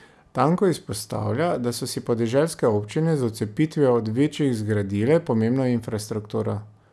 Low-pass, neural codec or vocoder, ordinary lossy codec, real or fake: none; none; none; real